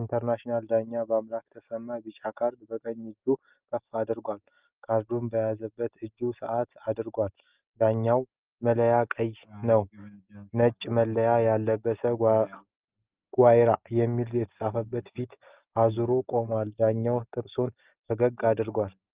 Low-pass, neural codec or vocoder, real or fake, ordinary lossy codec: 3.6 kHz; none; real; Opus, 32 kbps